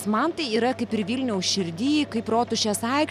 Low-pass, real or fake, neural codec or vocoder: 14.4 kHz; real; none